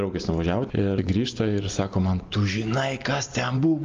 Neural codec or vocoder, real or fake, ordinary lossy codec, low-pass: none; real; Opus, 32 kbps; 7.2 kHz